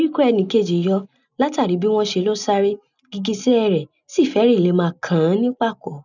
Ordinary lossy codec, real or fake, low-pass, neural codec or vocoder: none; real; 7.2 kHz; none